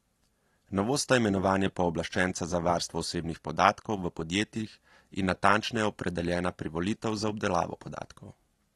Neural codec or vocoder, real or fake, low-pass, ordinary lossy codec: none; real; 19.8 kHz; AAC, 32 kbps